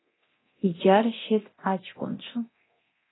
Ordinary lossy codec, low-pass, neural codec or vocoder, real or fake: AAC, 16 kbps; 7.2 kHz; codec, 24 kHz, 0.9 kbps, DualCodec; fake